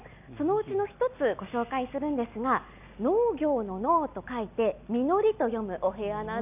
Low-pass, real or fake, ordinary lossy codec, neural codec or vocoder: 3.6 kHz; real; none; none